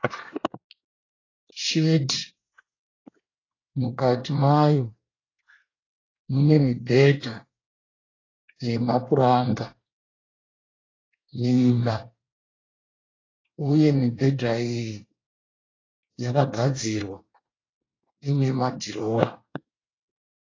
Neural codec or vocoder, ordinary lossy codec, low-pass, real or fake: codec, 24 kHz, 1 kbps, SNAC; AAC, 32 kbps; 7.2 kHz; fake